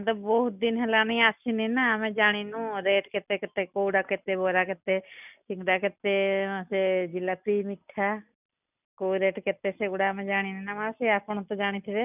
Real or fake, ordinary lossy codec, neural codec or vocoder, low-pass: real; none; none; 3.6 kHz